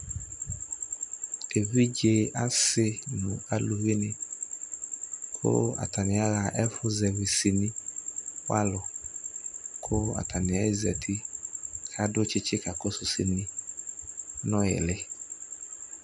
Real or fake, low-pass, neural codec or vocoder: real; 10.8 kHz; none